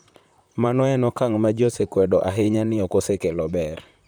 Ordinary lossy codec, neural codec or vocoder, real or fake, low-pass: none; vocoder, 44.1 kHz, 128 mel bands, Pupu-Vocoder; fake; none